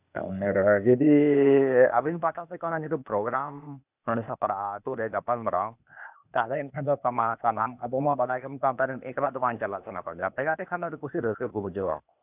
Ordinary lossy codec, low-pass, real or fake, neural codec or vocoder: none; 3.6 kHz; fake; codec, 16 kHz, 0.8 kbps, ZipCodec